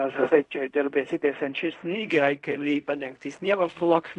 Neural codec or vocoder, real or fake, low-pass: codec, 16 kHz in and 24 kHz out, 0.4 kbps, LongCat-Audio-Codec, fine tuned four codebook decoder; fake; 10.8 kHz